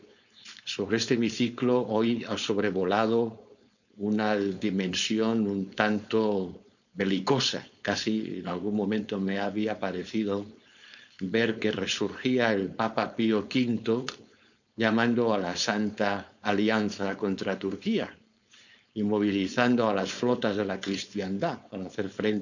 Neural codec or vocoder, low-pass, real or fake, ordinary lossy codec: codec, 16 kHz, 4.8 kbps, FACodec; 7.2 kHz; fake; none